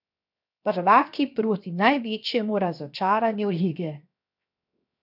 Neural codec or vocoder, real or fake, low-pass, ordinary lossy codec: codec, 16 kHz, 0.7 kbps, FocalCodec; fake; 5.4 kHz; none